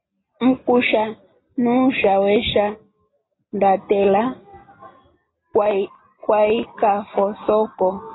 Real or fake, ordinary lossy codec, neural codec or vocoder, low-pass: real; AAC, 16 kbps; none; 7.2 kHz